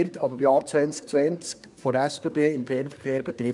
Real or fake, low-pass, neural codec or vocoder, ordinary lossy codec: fake; 10.8 kHz; codec, 24 kHz, 1 kbps, SNAC; none